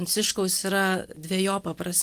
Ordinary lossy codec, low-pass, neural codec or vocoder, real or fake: Opus, 32 kbps; 14.4 kHz; none; real